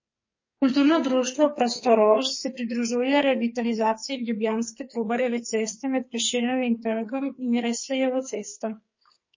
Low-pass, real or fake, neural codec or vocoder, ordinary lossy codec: 7.2 kHz; fake; codec, 44.1 kHz, 2.6 kbps, SNAC; MP3, 32 kbps